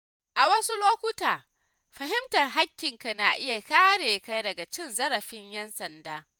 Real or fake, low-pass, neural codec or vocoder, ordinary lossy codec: fake; none; vocoder, 48 kHz, 128 mel bands, Vocos; none